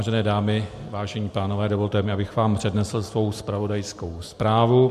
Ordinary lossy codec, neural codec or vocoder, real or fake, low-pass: MP3, 64 kbps; none; real; 14.4 kHz